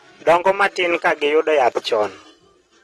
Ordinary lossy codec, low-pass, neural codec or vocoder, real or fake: AAC, 32 kbps; 19.8 kHz; none; real